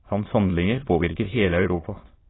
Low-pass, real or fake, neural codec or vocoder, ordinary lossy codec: 7.2 kHz; fake; autoencoder, 22.05 kHz, a latent of 192 numbers a frame, VITS, trained on many speakers; AAC, 16 kbps